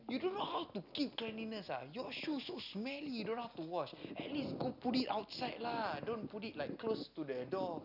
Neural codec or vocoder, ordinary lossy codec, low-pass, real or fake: none; none; 5.4 kHz; real